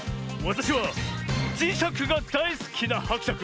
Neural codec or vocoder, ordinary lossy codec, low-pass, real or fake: none; none; none; real